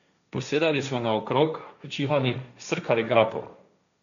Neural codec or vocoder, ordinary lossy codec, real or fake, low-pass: codec, 16 kHz, 1.1 kbps, Voila-Tokenizer; none; fake; 7.2 kHz